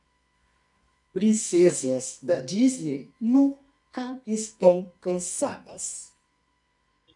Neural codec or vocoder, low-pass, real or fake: codec, 24 kHz, 0.9 kbps, WavTokenizer, medium music audio release; 10.8 kHz; fake